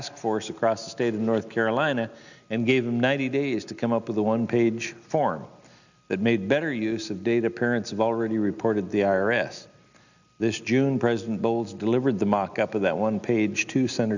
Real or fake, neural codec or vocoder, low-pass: real; none; 7.2 kHz